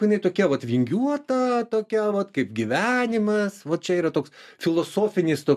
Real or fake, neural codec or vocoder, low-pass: real; none; 14.4 kHz